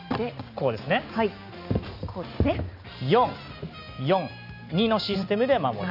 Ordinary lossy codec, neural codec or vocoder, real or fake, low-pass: none; none; real; 5.4 kHz